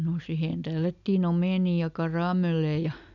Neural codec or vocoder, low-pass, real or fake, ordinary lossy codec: none; 7.2 kHz; real; none